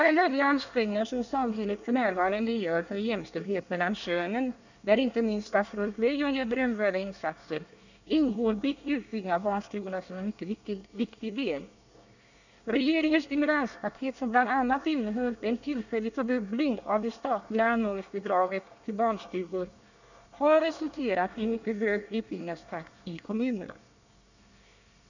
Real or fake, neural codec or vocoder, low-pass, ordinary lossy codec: fake; codec, 24 kHz, 1 kbps, SNAC; 7.2 kHz; none